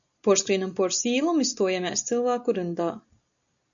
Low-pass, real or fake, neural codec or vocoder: 7.2 kHz; real; none